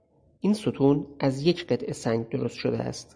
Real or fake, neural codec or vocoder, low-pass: real; none; 9.9 kHz